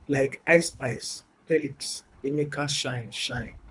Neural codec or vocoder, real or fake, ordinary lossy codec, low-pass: codec, 24 kHz, 3 kbps, HILCodec; fake; none; 10.8 kHz